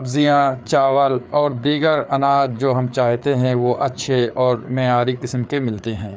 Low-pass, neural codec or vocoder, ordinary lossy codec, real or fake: none; codec, 16 kHz, 4 kbps, FreqCodec, larger model; none; fake